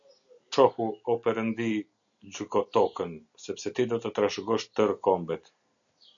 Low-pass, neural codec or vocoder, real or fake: 7.2 kHz; none; real